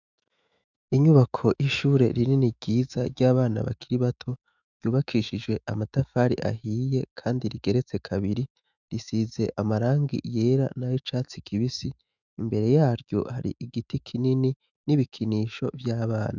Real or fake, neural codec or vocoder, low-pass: fake; autoencoder, 48 kHz, 128 numbers a frame, DAC-VAE, trained on Japanese speech; 7.2 kHz